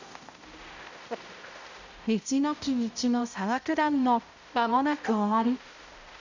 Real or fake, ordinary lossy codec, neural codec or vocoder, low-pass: fake; none; codec, 16 kHz, 0.5 kbps, X-Codec, HuBERT features, trained on balanced general audio; 7.2 kHz